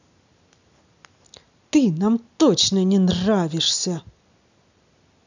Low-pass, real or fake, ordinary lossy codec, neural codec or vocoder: 7.2 kHz; real; none; none